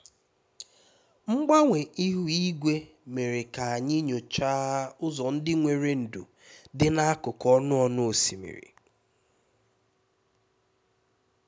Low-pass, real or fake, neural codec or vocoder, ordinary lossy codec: none; real; none; none